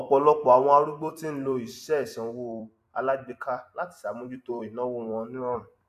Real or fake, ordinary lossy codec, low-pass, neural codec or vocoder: fake; AAC, 96 kbps; 14.4 kHz; vocoder, 44.1 kHz, 128 mel bands every 256 samples, BigVGAN v2